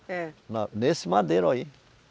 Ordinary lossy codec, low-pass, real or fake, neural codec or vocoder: none; none; real; none